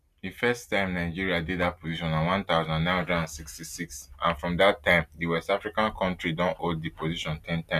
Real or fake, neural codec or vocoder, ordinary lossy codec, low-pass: real; none; none; 14.4 kHz